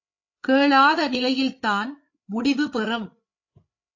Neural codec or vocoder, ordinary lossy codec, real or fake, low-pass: codec, 16 kHz, 8 kbps, FreqCodec, larger model; AAC, 48 kbps; fake; 7.2 kHz